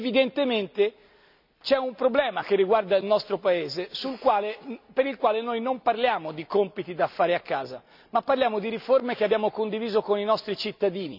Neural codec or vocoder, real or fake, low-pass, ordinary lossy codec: none; real; 5.4 kHz; none